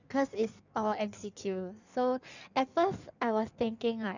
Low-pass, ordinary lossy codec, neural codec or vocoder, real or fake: 7.2 kHz; none; codec, 16 kHz in and 24 kHz out, 1.1 kbps, FireRedTTS-2 codec; fake